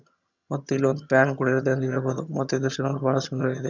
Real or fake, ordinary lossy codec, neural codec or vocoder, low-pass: fake; none; vocoder, 22.05 kHz, 80 mel bands, HiFi-GAN; 7.2 kHz